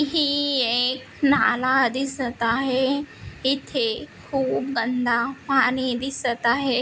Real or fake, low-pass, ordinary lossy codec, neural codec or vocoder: real; none; none; none